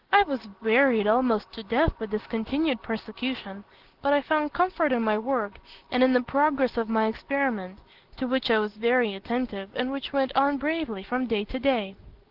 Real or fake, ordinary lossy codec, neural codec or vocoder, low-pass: real; Opus, 16 kbps; none; 5.4 kHz